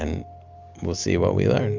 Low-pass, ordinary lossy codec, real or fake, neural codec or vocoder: 7.2 kHz; MP3, 64 kbps; real; none